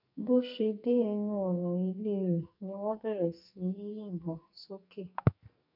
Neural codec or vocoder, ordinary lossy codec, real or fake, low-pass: codec, 44.1 kHz, 2.6 kbps, SNAC; none; fake; 5.4 kHz